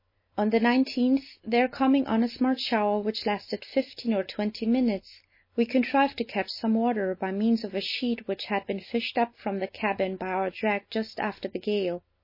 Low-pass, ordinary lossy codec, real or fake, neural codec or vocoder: 5.4 kHz; MP3, 24 kbps; real; none